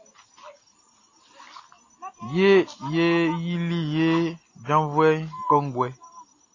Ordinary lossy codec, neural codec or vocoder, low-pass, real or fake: AAC, 32 kbps; none; 7.2 kHz; real